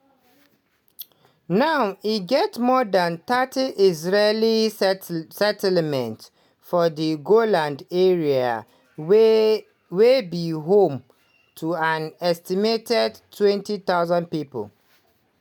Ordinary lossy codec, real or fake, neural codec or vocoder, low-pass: none; real; none; 19.8 kHz